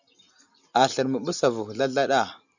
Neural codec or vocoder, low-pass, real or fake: none; 7.2 kHz; real